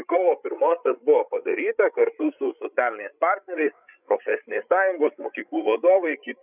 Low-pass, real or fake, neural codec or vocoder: 3.6 kHz; fake; codec, 16 kHz, 8 kbps, FreqCodec, larger model